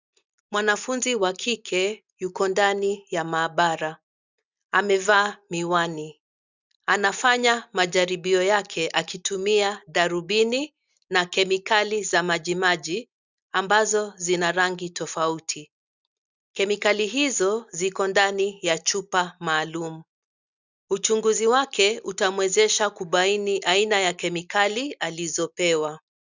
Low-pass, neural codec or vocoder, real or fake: 7.2 kHz; none; real